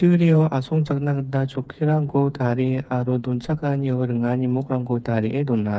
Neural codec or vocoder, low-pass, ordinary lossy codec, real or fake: codec, 16 kHz, 4 kbps, FreqCodec, smaller model; none; none; fake